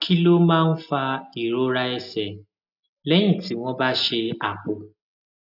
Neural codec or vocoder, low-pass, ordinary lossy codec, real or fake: none; 5.4 kHz; none; real